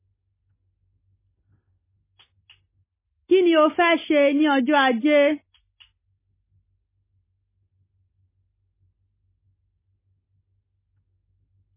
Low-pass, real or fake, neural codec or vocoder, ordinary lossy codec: 3.6 kHz; real; none; MP3, 16 kbps